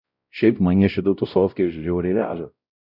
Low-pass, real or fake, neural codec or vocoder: 5.4 kHz; fake; codec, 16 kHz, 0.5 kbps, X-Codec, WavLM features, trained on Multilingual LibriSpeech